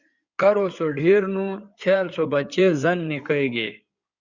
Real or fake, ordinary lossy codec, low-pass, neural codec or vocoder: fake; Opus, 64 kbps; 7.2 kHz; codec, 16 kHz in and 24 kHz out, 2.2 kbps, FireRedTTS-2 codec